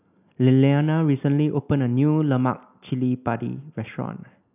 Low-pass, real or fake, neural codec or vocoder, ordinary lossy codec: 3.6 kHz; real; none; none